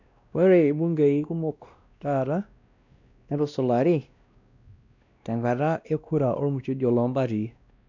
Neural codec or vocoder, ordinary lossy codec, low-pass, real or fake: codec, 16 kHz, 1 kbps, X-Codec, WavLM features, trained on Multilingual LibriSpeech; none; 7.2 kHz; fake